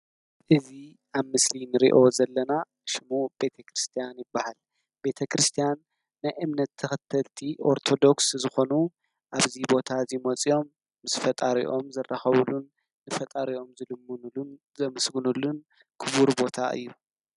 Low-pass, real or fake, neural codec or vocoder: 10.8 kHz; real; none